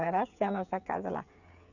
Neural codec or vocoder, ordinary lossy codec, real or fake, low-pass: codec, 16 kHz, 8 kbps, FreqCodec, smaller model; none; fake; 7.2 kHz